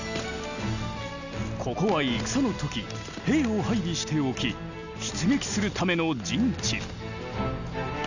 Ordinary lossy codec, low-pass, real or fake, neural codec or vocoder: none; 7.2 kHz; real; none